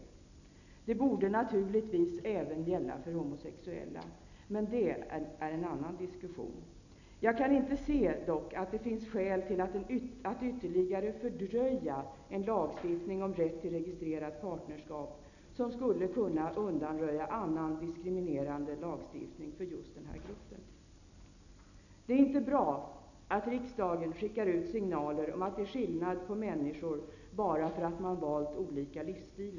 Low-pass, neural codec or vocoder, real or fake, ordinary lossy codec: 7.2 kHz; none; real; none